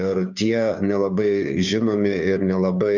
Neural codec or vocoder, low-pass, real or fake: codec, 16 kHz, 2 kbps, FunCodec, trained on Chinese and English, 25 frames a second; 7.2 kHz; fake